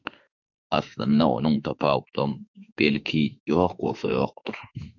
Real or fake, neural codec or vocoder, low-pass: fake; autoencoder, 48 kHz, 32 numbers a frame, DAC-VAE, trained on Japanese speech; 7.2 kHz